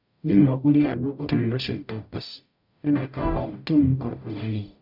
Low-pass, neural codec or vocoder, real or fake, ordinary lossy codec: 5.4 kHz; codec, 44.1 kHz, 0.9 kbps, DAC; fake; none